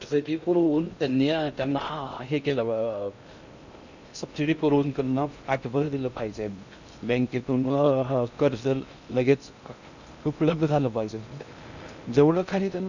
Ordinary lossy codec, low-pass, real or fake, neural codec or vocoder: none; 7.2 kHz; fake; codec, 16 kHz in and 24 kHz out, 0.6 kbps, FocalCodec, streaming, 2048 codes